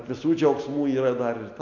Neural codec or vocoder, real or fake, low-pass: none; real; 7.2 kHz